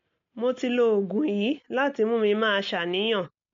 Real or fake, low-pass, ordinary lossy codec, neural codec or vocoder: real; 7.2 kHz; MP3, 48 kbps; none